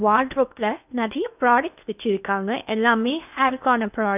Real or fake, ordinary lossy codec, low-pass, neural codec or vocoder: fake; none; 3.6 kHz; codec, 16 kHz in and 24 kHz out, 0.8 kbps, FocalCodec, streaming, 65536 codes